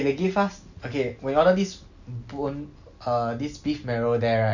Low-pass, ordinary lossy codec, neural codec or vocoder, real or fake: 7.2 kHz; none; none; real